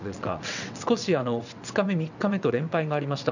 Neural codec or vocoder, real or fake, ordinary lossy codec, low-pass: vocoder, 22.05 kHz, 80 mel bands, WaveNeXt; fake; none; 7.2 kHz